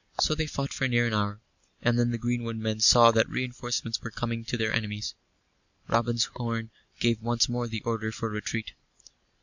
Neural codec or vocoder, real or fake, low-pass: none; real; 7.2 kHz